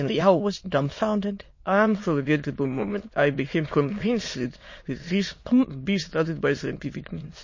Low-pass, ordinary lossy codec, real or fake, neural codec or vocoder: 7.2 kHz; MP3, 32 kbps; fake; autoencoder, 22.05 kHz, a latent of 192 numbers a frame, VITS, trained on many speakers